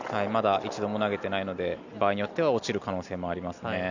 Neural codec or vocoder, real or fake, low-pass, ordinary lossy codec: none; real; 7.2 kHz; none